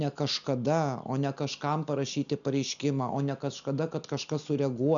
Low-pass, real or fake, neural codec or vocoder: 7.2 kHz; real; none